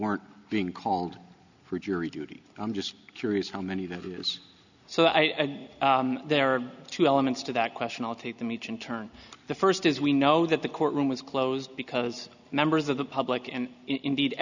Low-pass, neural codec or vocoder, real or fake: 7.2 kHz; none; real